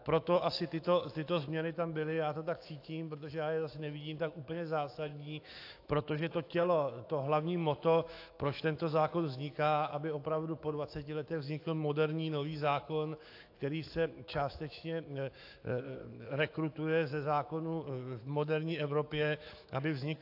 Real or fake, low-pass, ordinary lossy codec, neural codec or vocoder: fake; 5.4 kHz; AAC, 32 kbps; autoencoder, 48 kHz, 128 numbers a frame, DAC-VAE, trained on Japanese speech